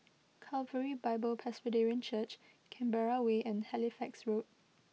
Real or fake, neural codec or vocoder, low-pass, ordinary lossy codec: real; none; none; none